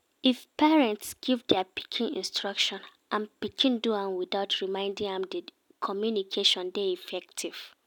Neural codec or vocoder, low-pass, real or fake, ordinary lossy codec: none; 19.8 kHz; real; none